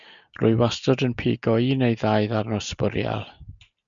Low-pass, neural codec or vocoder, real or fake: 7.2 kHz; none; real